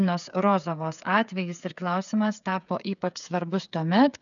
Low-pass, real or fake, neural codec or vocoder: 7.2 kHz; fake; codec, 16 kHz, 8 kbps, FreqCodec, smaller model